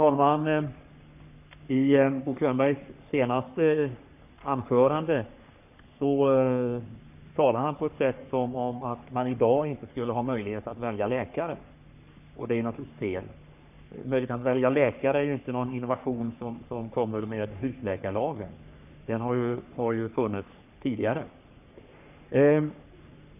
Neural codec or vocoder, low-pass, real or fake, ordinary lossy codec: codec, 44.1 kHz, 3.4 kbps, Pupu-Codec; 3.6 kHz; fake; none